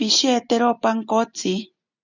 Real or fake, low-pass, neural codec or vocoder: real; 7.2 kHz; none